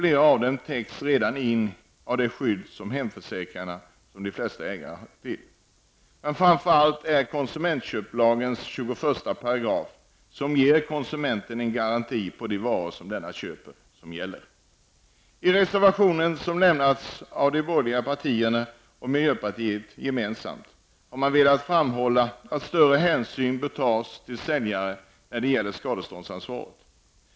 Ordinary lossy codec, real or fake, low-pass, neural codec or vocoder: none; real; none; none